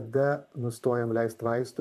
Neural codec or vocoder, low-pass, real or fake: vocoder, 44.1 kHz, 128 mel bands, Pupu-Vocoder; 14.4 kHz; fake